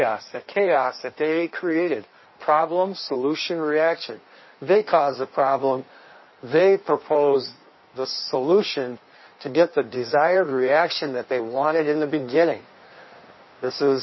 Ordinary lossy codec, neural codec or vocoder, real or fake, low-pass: MP3, 24 kbps; codec, 16 kHz in and 24 kHz out, 1.1 kbps, FireRedTTS-2 codec; fake; 7.2 kHz